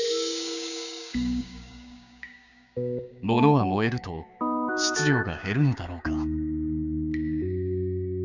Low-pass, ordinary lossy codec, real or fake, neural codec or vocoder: 7.2 kHz; none; fake; codec, 16 kHz, 6 kbps, DAC